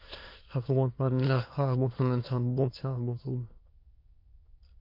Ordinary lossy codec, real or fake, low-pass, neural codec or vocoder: MP3, 32 kbps; fake; 5.4 kHz; autoencoder, 22.05 kHz, a latent of 192 numbers a frame, VITS, trained on many speakers